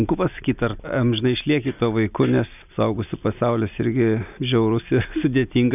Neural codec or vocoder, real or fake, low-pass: none; real; 3.6 kHz